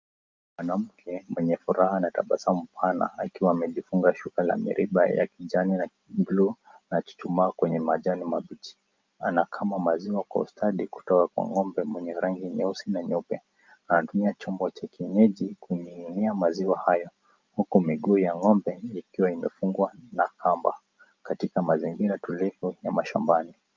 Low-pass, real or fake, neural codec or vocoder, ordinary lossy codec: 7.2 kHz; real; none; Opus, 24 kbps